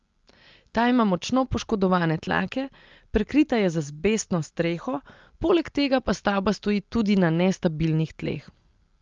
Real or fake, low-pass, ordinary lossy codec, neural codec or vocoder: real; 7.2 kHz; Opus, 32 kbps; none